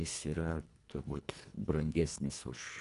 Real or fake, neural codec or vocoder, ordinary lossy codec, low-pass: fake; codec, 24 kHz, 1.5 kbps, HILCodec; AAC, 64 kbps; 10.8 kHz